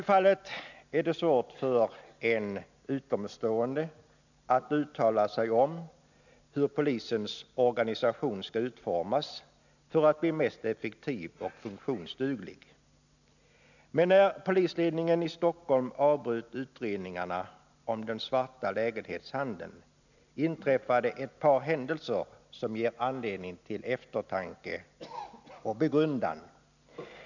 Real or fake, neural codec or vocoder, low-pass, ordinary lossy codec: real; none; 7.2 kHz; none